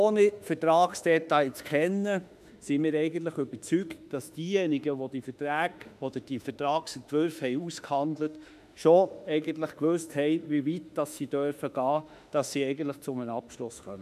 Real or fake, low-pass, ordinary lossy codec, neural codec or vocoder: fake; 14.4 kHz; none; autoencoder, 48 kHz, 32 numbers a frame, DAC-VAE, trained on Japanese speech